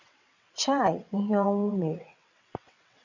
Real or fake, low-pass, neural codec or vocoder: fake; 7.2 kHz; vocoder, 22.05 kHz, 80 mel bands, WaveNeXt